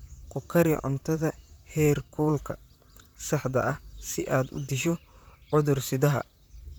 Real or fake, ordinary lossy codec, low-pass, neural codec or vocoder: fake; none; none; vocoder, 44.1 kHz, 128 mel bands, Pupu-Vocoder